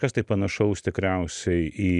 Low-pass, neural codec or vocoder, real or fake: 10.8 kHz; none; real